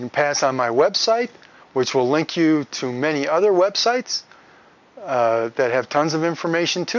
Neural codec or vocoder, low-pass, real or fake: none; 7.2 kHz; real